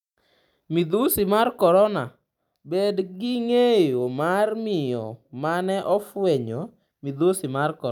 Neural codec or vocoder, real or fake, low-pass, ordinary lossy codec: none; real; 19.8 kHz; none